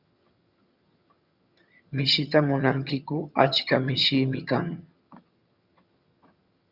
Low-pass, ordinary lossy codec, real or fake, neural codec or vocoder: 5.4 kHz; Opus, 64 kbps; fake; vocoder, 22.05 kHz, 80 mel bands, HiFi-GAN